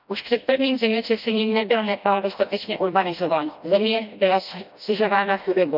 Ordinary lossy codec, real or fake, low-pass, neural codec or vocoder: none; fake; 5.4 kHz; codec, 16 kHz, 1 kbps, FreqCodec, smaller model